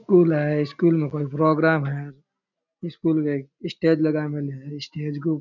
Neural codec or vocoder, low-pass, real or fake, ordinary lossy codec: none; 7.2 kHz; real; none